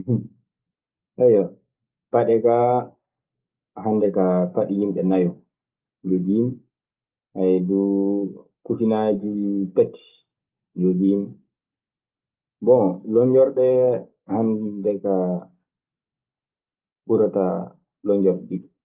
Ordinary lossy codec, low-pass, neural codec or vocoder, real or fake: Opus, 32 kbps; 3.6 kHz; none; real